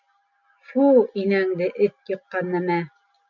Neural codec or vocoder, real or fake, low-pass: none; real; 7.2 kHz